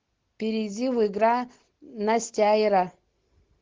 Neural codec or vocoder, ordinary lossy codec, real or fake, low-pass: none; Opus, 16 kbps; real; 7.2 kHz